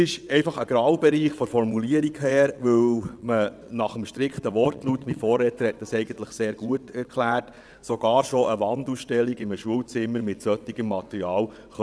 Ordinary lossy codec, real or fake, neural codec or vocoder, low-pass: none; fake; vocoder, 22.05 kHz, 80 mel bands, WaveNeXt; none